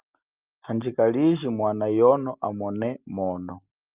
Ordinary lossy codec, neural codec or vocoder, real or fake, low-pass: Opus, 24 kbps; none; real; 3.6 kHz